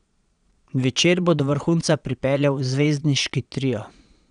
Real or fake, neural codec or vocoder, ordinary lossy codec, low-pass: fake; vocoder, 22.05 kHz, 80 mel bands, Vocos; none; 9.9 kHz